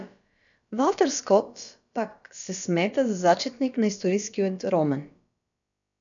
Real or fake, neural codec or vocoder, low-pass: fake; codec, 16 kHz, about 1 kbps, DyCAST, with the encoder's durations; 7.2 kHz